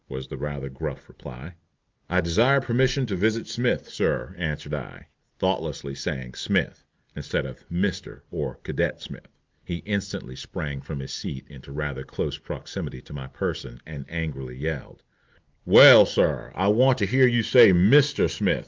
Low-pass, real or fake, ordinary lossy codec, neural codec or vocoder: 7.2 kHz; real; Opus, 24 kbps; none